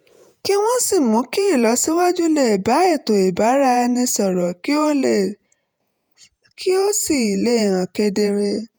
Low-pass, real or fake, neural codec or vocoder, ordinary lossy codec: none; fake; vocoder, 48 kHz, 128 mel bands, Vocos; none